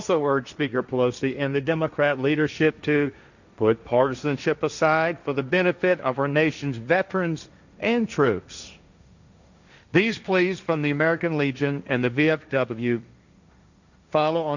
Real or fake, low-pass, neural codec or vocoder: fake; 7.2 kHz; codec, 16 kHz, 1.1 kbps, Voila-Tokenizer